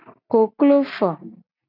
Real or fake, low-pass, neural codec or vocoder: real; 5.4 kHz; none